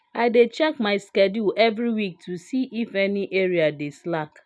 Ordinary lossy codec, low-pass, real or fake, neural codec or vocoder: none; none; real; none